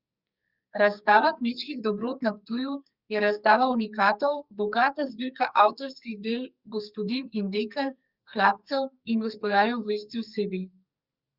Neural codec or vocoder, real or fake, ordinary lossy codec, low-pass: codec, 44.1 kHz, 2.6 kbps, SNAC; fake; Opus, 64 kbps; 5.4 kHz